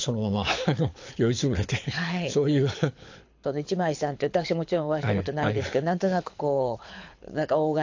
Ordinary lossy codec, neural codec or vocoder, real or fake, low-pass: AAC, 48 kbps; codec, 24 kHz, 6 kbps, HILCodec; fake; 7.2 kHz